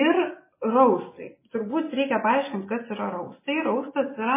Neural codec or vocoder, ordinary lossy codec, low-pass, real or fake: none; MP3, 16 kbps; 3.6 kHz; real